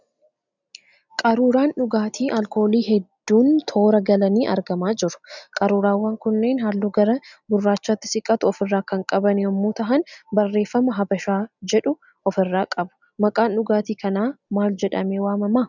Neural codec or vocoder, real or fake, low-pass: none; real; 7.2 kHz